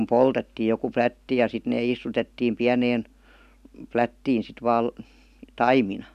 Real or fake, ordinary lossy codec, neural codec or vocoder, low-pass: real; none; none; 14.4 kHz